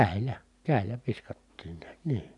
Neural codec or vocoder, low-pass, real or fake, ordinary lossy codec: none; 10.8 kHz; real; none